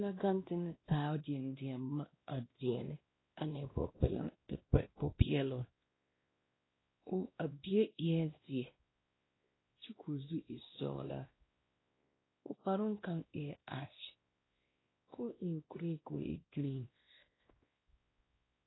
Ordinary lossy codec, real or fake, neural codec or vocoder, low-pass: AAC, 16 kbps; fake; codec, 16 kHz, 1 kbps, X-Codec, WavLM features, trained on Multilingual LibriSpeech; 7.2 kHz